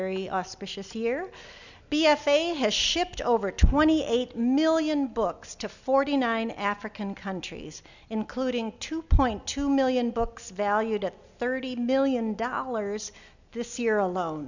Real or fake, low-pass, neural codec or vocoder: real; 7.2 kHz; none